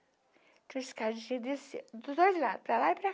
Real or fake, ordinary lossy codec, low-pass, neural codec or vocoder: real; none; none; none